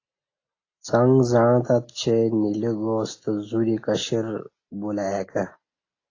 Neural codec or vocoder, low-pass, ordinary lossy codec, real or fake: none; 7.2 kHz; AAC, 32 kbps; real